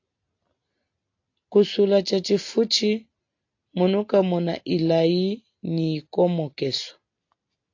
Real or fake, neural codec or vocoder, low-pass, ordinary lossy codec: real; none; 7.2 kHz; AAC, 48 kbps